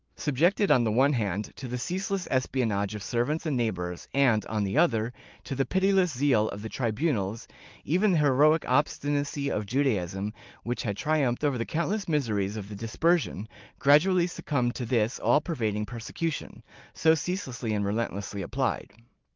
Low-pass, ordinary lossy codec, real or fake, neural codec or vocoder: 7.2 kHz; Opus, 24 kbps; real; none